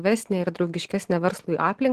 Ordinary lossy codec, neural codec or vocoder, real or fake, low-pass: Opus, 16 kbps; none; real; 14.4 kHz